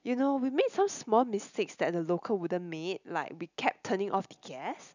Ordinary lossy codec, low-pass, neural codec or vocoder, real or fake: none; 7.2 kHz; none; real